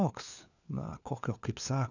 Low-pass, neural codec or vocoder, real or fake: 7.2 kHz; codec, 16 kHz, 4 kbps, FunCodec, trained on LibriTTS, 50 frames a second; fake